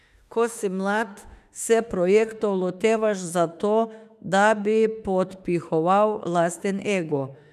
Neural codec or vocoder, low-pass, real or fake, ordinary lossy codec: autoencoder, 48 kHz, 32 numbers a frame, DAC-VAE, trained on Japanese speech; 14.4 kHz; fake; none